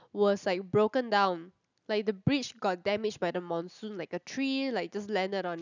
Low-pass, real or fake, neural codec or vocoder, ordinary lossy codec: 7.2 kHz; real; none; none